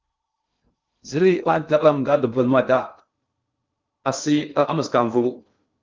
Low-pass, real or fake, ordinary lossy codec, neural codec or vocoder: 7.2 kHz; fake; Opus, 24 kbps; codec, 16 kHz in and 24 kHz out, 0.6 kbps, FocalCodec, streaming, 2048 codes